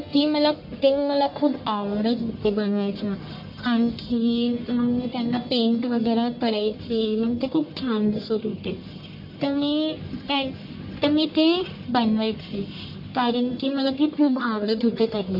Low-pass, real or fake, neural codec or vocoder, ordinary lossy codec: 5.4 kHz; fake; codec, 44.1 kHz, 1.7 kbps, Pupu-Codec; MP3, 32 kbps